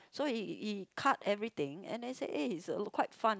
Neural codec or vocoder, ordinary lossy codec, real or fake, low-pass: none; none; real; none